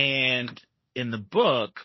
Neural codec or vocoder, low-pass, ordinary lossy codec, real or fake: codec, 16 kHz, 1.1 kbps, Voila-Tokenizer; 7.2 kHz; MP3, 24 kbps; fake